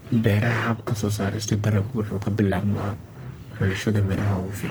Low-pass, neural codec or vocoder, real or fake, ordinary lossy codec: none; codec, 44.1 kHz, 1.7 kbps, Pupu-Codec; fake; none